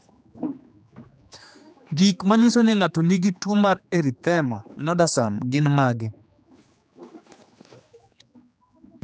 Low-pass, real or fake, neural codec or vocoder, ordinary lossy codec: none; fake; codec, 16 kHz, 2 kbps, X-Codec, HuBERT features, trained on general audio; none